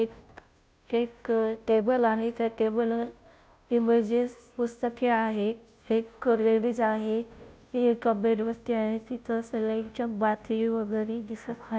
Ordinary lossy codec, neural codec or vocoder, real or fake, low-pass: none; codec, 16 kHz, 0.5 kbps, FunCodec, trained on Chinese and English, 25 frames a second; fake; none